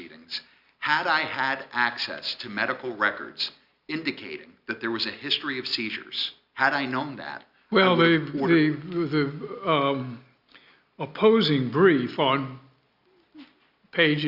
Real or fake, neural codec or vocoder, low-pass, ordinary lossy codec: real; none; 5.4 kHz; Opus, 64 kbps